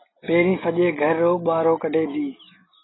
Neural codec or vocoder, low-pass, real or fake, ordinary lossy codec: none; 7.2 kHz; real; AAC, 16 kbps